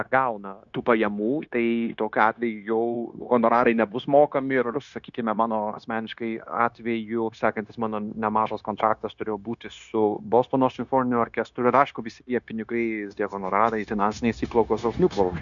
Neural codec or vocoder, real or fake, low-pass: codec, 16 kHz, 0.9 kbps, LongCat-Audio-Codec; fake; 7.2 kHz